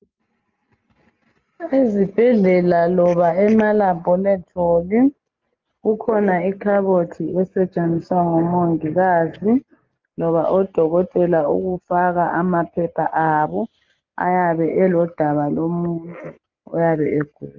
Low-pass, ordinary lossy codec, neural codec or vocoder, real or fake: 7.2 kHz; Opus, 32 kbps; none; real